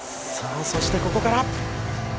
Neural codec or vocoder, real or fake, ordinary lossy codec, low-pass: none; real; none; none